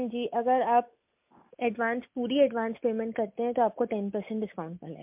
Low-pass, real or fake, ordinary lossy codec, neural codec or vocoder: 3.6 kHz; real; MP3, 32 kbps; none